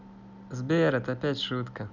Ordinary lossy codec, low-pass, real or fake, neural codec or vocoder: none; 7.2 kHz; real; none